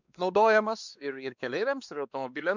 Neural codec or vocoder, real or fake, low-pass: codec, 16 kHz, 2 kbps, X-Codec, WavLM features, trained on Multilingual LibriSpeech; fake; 7.2 kHz